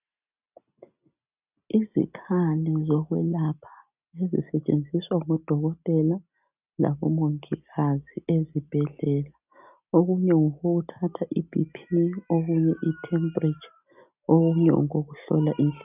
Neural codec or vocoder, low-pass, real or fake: none; 3.6 kHz; real